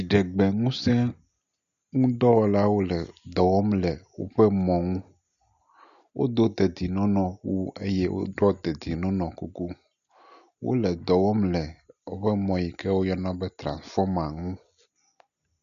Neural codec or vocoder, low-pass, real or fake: none; 7.2 kHz; real